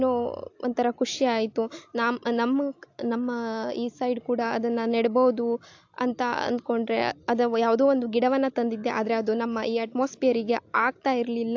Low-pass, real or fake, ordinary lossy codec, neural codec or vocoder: 7.2 kHz; real; AAC, 48 kbps; none